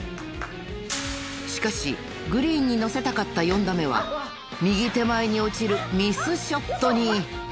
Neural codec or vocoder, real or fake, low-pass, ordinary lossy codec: none; real; none; none